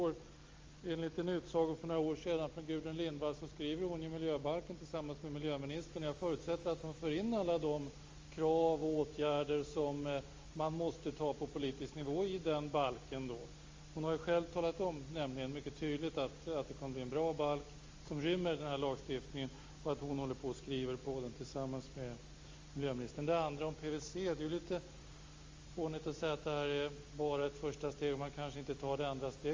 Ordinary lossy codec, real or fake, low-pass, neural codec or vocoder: Opus, 32 kbps; real; 7.2 kHz; none